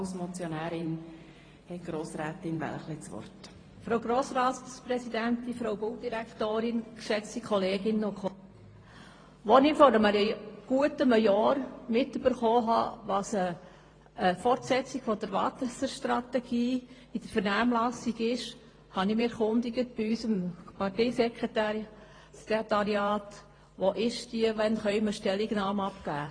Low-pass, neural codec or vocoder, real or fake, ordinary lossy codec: 9.9 kHz; vocoder, 44.1 kHz, 128 mel bands every 512 samples, BigVGAN v2; fake; AAC, 32 kbps